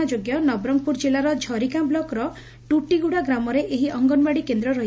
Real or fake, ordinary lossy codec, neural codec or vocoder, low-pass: real; none; none; none